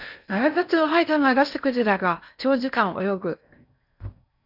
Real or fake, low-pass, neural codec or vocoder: fake; 5.4 kHz; codec, 16 kHz in and 24 kHz out, 0.6 kbps, FocalCodec, streaming, 4096 codes